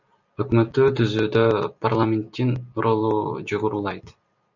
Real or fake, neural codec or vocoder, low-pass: real; none; 7.2 kHz